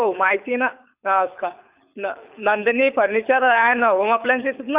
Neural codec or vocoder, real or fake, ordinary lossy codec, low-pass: codec, 24 kHz, 6 kbps, HILCodec; fake; Opus, 64 kbps; 3.6 kHz